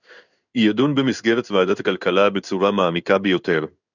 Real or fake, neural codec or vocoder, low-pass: fake; codec, 16 kHz in and 24 kHz out, 1 kbps, XY-Tokenizer; 7.2 kHz